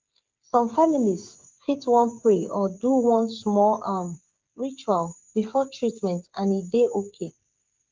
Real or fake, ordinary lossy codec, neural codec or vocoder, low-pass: fake; Opus, 32 kbps; codec, 16 kHz, 8 kbps, FreqCodec, smaller model; 7.2 kHz